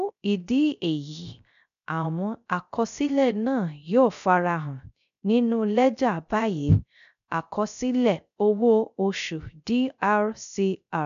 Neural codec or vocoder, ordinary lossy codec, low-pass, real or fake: codec, 16 kHz, 0.3 kbps, FocalCodec; none; 7.2 kHz; fake